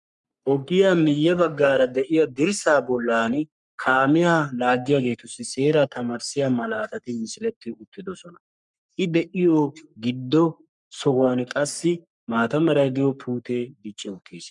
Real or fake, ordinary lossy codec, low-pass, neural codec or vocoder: fake; MP3, 96 kbps; 10.8 kHz; codec, 44.1 kHz, 3.4 kbps, Pupu-Codec